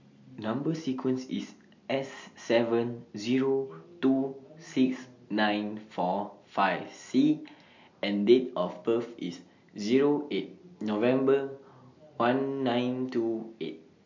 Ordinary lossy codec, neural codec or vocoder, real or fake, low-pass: MP3, 48 kbps; none; real; 7.2 kHz